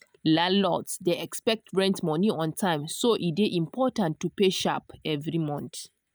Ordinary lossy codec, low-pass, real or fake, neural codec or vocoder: none; none; real; none